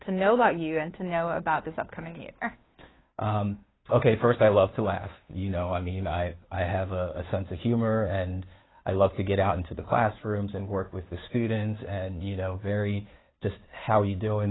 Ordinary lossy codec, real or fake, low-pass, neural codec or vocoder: AAC, 16 kbps; fake; 7.2 kHz; codec, 16 kHz, 2 kbps, FunCodec, trained on Chinese and English, 25 frames a second